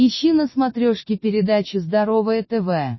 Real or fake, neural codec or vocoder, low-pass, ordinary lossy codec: real; none; 7.2 kHz; MP3, 24 kbps